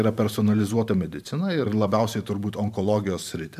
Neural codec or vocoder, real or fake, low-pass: vocoder, 44.1 kHz, 128 mel bands every 512 samples, BigVGAN v2; fake; 14.4 kHz